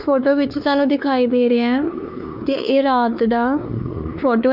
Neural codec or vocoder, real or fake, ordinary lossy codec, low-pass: codec, 16 kHz, 2 kbps, X-Codec, WavLM features, trained on Multilingual LibriSpeech; fake; none; 5.4 kHz